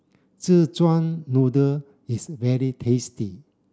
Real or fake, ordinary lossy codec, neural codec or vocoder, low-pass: real; none; none; none